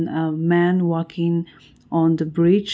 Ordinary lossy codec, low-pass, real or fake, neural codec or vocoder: none; none; real; none